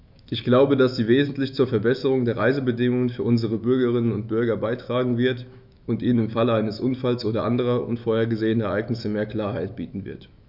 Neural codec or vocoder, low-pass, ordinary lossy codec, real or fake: autoencoder, 48 kHz, 128 numbers a frame, DAC-VAE, trained on Japanese speech; 5.4 kHz; none; fake